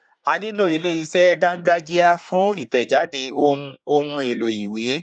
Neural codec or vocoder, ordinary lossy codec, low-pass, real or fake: codec, 24 kHz, 1 kbps, SNAC; none; 9.9 kHz; fake